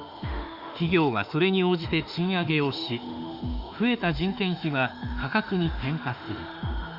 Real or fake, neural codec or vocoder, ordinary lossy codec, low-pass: fake; autoencoder, 48 kHz, 32 numbers a frame, DAC-VAE, trained on Japanese speech; Opus, 64 kbps; 5.4 kHz